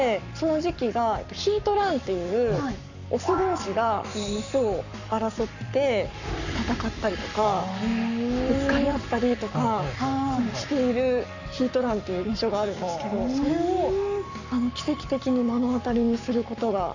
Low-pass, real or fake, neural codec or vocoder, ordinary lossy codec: 7.2 kHz; fake; codec, 44.1 kHz, 7.8 kbps, Pupu-Codec; none